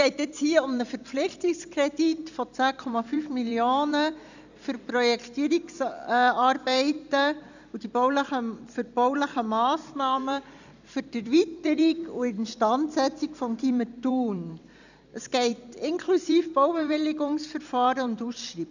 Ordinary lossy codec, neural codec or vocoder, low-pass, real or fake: none; vocoder, 44.1 kHz, 128 mel bands every 256 samples, BigVGAN v2; 7.2 kHz; fake